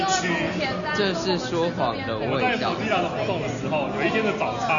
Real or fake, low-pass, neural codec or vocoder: real; 7.2 kHz; none